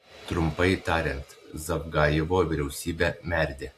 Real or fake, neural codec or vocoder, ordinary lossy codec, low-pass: real; none; AAC, 64 kbps; 14.4 kHz